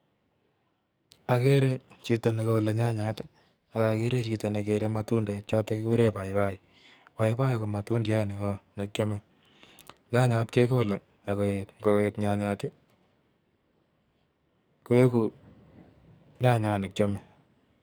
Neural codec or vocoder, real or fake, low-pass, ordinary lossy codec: codec, 44.1 kHz, 2.6 kbps, SNAC; fake; none; none